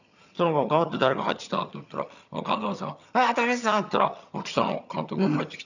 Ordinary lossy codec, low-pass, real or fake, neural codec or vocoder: none; 7.2 kHz; fake; vocoder, 22.05 kHz, 80 mel bands, HiFi-GAN